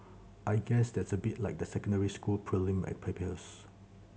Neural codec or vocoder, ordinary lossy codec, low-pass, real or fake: none; none; none; real